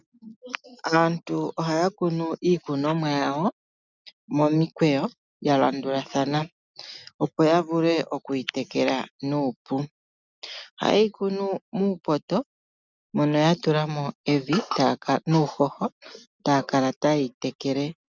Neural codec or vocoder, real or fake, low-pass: none; real; 7.2 kHz